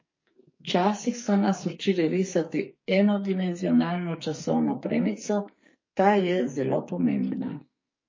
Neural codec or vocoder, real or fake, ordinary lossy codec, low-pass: codec, 44.1 kHz, 2.6 kbps, SNAC; fake; MP3, 32 kbps; 7.2 kHz